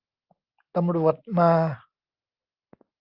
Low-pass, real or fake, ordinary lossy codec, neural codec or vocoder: 5.4 kHz; real; Opus, 32 kbps; none